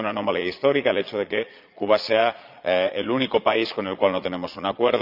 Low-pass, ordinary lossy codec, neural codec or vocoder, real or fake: 5.4 kHz; none; vocoder, 22.05 kHz, 80 mel bands, Vocos; fake